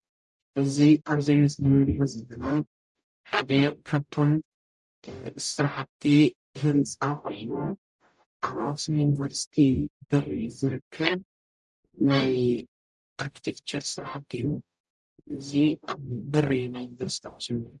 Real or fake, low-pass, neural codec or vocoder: fake; 10.8 kHz; codec, 44.1 kHz, 0.9 kbps, DAC